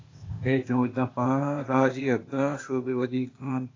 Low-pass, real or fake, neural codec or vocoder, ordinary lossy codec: 7.2 kHz; fake; codec, 16 kHz, 0.8 kbps, ZipCodec; AAC, 32 kbps